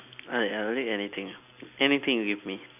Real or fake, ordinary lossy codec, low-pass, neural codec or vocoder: real; none; 3.6 kHz; none